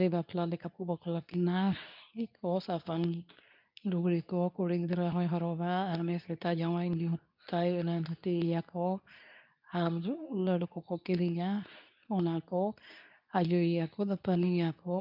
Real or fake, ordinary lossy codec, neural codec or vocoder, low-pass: fake; none; codec, 24 kHz, 0.9 kbps, WavTokenizer, medium speech release version 2; 5.4 kHz